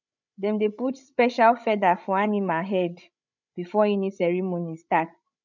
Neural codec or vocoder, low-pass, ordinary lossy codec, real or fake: codec, 16 kHz, 16 kbps, FreqCodec, larger model; 7.2 kHz; none; fake